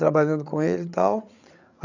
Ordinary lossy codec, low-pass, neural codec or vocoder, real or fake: none; 7.2 kHz; codec, 16 kHz, 8 kbps, FreqCodec, larger model; fake